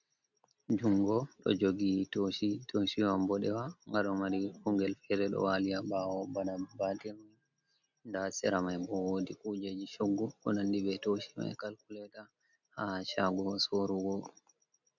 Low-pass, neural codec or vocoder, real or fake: 7.2 kHz; none; real